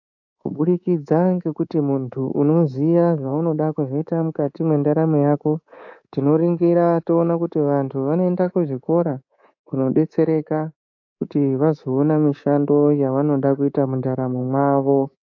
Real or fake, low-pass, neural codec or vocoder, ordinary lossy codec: fake; 7.2 kHz; codec, 24 kHz, 3.1 kbps, DualCodec; AAC, 48 kbps